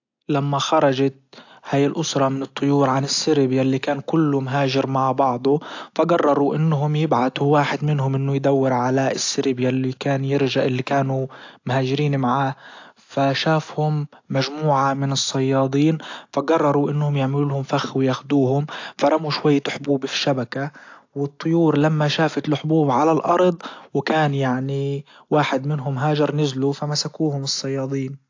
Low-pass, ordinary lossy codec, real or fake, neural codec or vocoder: 7.2 kHz; AAC, 48 kbps; real; none